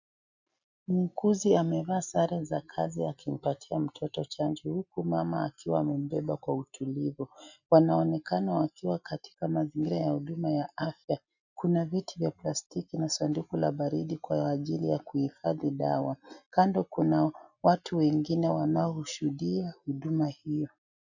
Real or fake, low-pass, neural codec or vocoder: real; 7.2 kHz; none